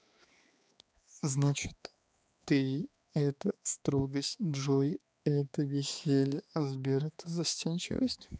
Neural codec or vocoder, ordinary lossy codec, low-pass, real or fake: codec, 16 kHz, 2 kbps, X-Codec, HuBERT features, trained on balanced general audio; none; none; fake